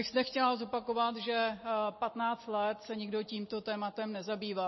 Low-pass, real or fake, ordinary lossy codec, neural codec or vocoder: 7.2 kHz; real; MP3, 24 kbps; none